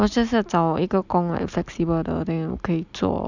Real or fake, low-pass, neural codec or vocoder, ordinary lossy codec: real; 7.2 kHz; none; none